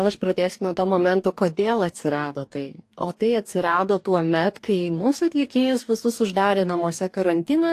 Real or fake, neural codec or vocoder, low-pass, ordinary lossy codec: fake; codec, 44.1 kHz, 2.6 kbps, DAC; 14.4 kHz; AAC, 64 kbps